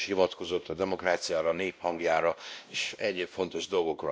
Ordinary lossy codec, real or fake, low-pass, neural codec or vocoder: none; fake; none; codec, 16 kHz, 1 kbps, X-Codec, WavLM features, trained on Multilingual LibriSpeech